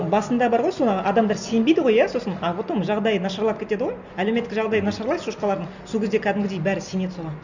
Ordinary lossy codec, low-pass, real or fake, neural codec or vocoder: none; 7.2 kHz; real; none